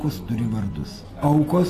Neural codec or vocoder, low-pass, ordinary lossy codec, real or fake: none; 14.4 kHz; AAC, 48 kbps; real